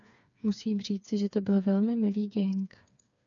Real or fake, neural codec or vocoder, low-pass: fake; codec, 16 kHz, 4 kbps, FreqCodec, smaller model; 7.2 kHz